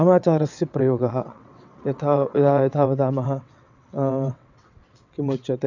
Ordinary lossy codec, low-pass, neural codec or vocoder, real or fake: none; 7.2 kHz; vocoder, 22.05 kHz, 80 mel bands, WaveNeXt; fake